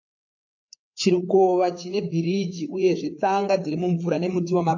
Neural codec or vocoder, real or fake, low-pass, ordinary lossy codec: codec, 16 kHz, 4 kbps, FreqCodec, larger model; fake; 7.2 kHz; AAC, 48 kbps